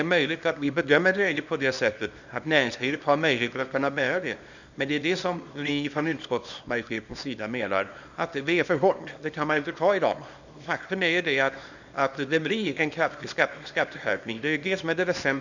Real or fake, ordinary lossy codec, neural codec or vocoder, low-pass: fake; none; codec, 24 kHz, 0.9 kbps, WavTokenizer, small release; 7.2 kHz